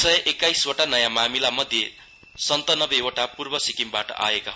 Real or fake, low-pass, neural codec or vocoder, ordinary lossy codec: real; none; none; none